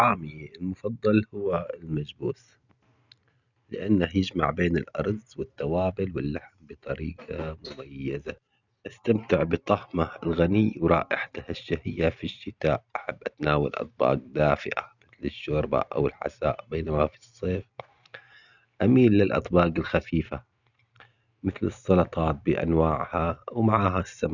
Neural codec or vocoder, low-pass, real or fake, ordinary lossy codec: none; 7.2 kHz; real; none